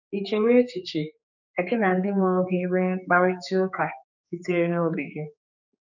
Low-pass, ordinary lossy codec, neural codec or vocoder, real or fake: 7.2 kHz; none; codec, 16 kHz, 4 kbps, X-Codec, HuBERT features, trained on general audio; fake